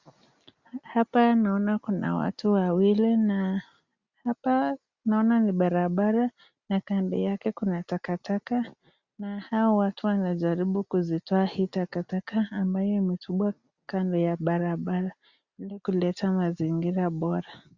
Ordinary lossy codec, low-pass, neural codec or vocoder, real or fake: Opus, 64 kbps; 7.2 kHz; none; real